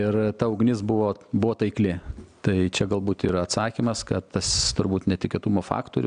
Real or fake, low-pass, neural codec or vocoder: real; 9.9 kHz; none